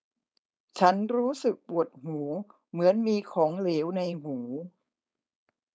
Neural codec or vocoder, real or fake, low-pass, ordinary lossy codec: codec, 16 kHz, 4.8 kbps, FACodec; fake; none; none